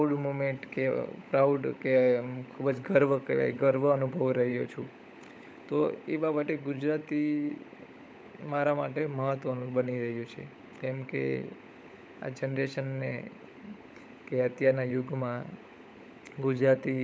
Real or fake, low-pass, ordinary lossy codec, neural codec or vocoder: fake; none; none; codec, 16 kHz, 16 kbps, FunCodec, trained on LibriTTS, 50 frames a second